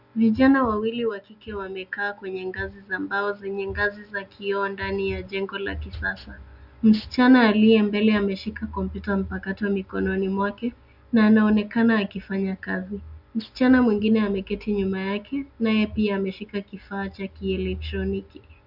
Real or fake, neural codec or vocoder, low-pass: real; none; 5.4 kHz